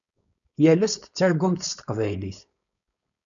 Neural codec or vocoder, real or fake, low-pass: codec, 16 kHz, 4.8 kbps, FACodec; fake; 7.2 kHz